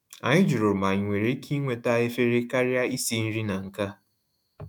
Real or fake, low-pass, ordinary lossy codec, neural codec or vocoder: fake; 19.8 kHz; none; autoencoder, 48 kHz, 128 numbers a frame, DAC-VAE, trained on Japanese speech